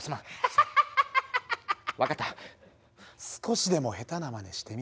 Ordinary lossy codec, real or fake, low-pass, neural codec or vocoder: none; real; none; none